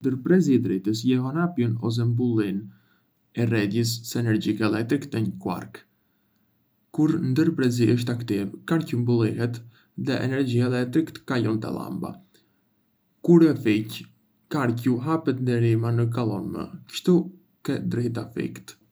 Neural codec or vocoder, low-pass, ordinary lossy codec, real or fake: none; none; none; real